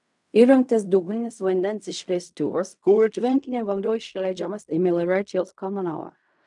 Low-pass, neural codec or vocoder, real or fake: 10.8 kHz; codec, 16 kHz in and 24 kHz out, 0.4 kbps, LongCat-Audio-Codec, fine tuned four codebook decoder; fake